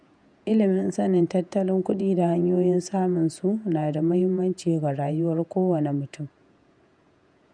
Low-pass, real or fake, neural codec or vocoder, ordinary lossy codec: 9.9 kHz; fake; vocoder, 48 kHz, 128 mel bands, Vocos; none